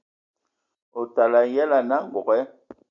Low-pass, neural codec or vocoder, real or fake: 7.2 kHz; none; real